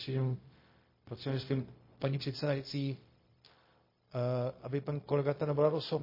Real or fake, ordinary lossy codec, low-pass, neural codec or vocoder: fake; MP3, 24 kbps; 5.4 kHz; codec, 16 kHz, 0.4 kbps, LongCat-Audio-Codec